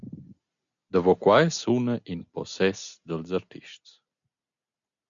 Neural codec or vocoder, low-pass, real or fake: none; 7.2 kHz; real